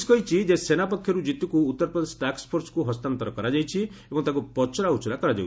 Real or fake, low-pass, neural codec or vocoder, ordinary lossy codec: real; none; none; none